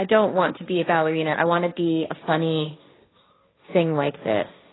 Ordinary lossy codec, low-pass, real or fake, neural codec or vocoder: AAC, 16 kbps; 7.2 kHz; fake; codec, 16 kHz, 1.1 kbps, Voila-Tokenizer